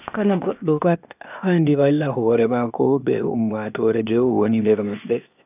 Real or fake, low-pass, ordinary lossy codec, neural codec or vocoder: fake; 3.6 kHz; none; codec, 16 kHz, 0.8 kbps, ZipCodec